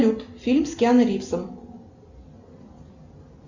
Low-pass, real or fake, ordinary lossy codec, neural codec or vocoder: 7.2 kHz; real; Opus, 64 kbps; none